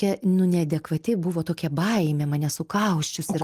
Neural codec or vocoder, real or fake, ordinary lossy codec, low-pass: none; real; Opus, 24 kbps; 14.4 kHz